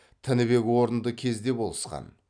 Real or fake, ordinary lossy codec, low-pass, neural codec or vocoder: real; none; 9.9 kHz; none